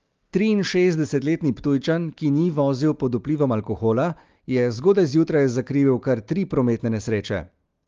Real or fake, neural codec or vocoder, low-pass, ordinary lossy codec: real; none; 7.2 kHz; Opus, 32 kbps